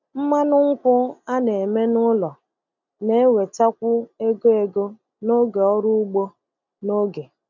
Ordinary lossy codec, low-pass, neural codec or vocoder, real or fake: none; 7.2 kHz; none; real